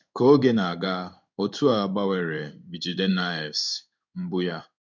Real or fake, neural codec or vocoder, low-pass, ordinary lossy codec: fake; codec, 16 kHz in and 24 kHz out, 1 kbps, XY-Tokenizer; 7.2 kHz; none